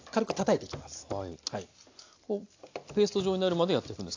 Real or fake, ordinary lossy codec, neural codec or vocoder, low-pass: real; AAC, 48 kbps; none; 7.2 kHz